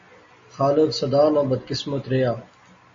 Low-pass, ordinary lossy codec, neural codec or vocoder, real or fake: 7.2 kHz; MP3, 32 kbps; none; real